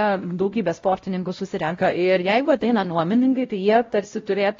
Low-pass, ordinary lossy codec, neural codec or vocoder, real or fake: 7.2 kHz; AAC, 32 kbps; codec, 16 kHz, 0.5 kbps, X-Codec, WavLM features, trained on Multilingual LibriSpeech; fake